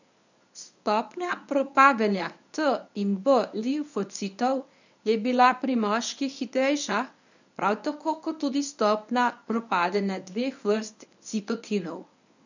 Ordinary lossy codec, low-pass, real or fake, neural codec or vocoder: none; 7.2 kHz; fake; codec, 24 kHz, 0.9 kbps, WavTokenizer, medium speech release version 1